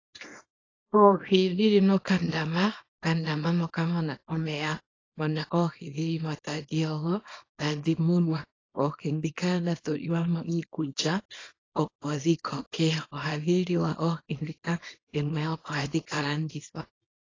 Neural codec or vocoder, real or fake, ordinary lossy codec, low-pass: codec, 24 kHz, 0.9 kbps, WavTokenizer, small release; fake; AAC, 32 kbps; 7.2 kHz